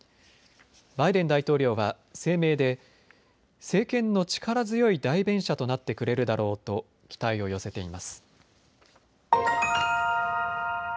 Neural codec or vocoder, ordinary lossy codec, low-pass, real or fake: none; none; none; real